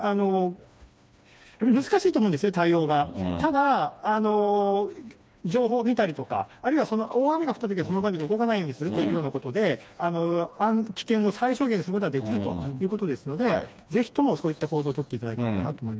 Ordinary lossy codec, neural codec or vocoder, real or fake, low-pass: none; codec, 16 kHz, 2 kbps, FreqCodec, smaller model; fake; none